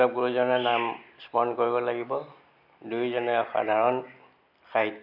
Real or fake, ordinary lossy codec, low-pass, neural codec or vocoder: real; none; 5.4 kHz; none